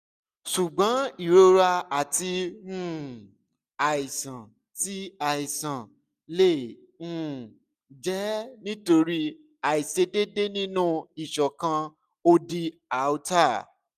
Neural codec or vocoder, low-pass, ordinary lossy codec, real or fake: none; 14.4 kHz; none; real